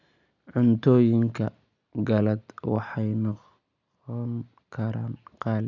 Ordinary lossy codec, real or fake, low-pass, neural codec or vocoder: none; real; 7.2 kHz; none